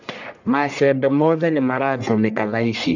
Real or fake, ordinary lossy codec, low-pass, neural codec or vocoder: fake; none; 7.2 kHz; codec, 44.1 kHz, 1.7 kbps, Pupu-Codec